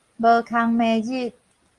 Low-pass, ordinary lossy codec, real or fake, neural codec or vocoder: 10.8 kHz; Opus, 32 kbps; real; none